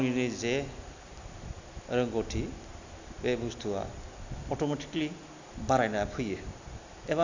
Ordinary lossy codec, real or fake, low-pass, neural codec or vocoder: Opus, 64 kbps; real; 7.2 kHz; none